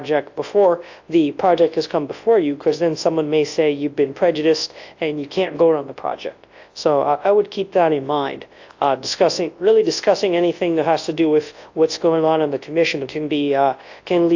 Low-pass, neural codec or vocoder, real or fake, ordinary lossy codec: 7.2 kHz; codec, 24 kHz, 0.9 kbps, WavTokenizer, large speech release; fake; AAC, 48 kbps